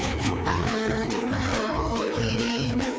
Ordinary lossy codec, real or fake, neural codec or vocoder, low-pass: none; fake; codec, 16 kHz, 2 kbps, FreqCodec, larger model; none